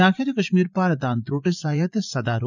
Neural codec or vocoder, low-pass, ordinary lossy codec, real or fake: vocoder, 44.1 kHz, 80 mel bands, Vocos; 7.2 kHz; none; fake